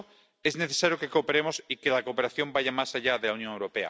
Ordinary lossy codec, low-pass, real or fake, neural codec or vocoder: none; none; real; none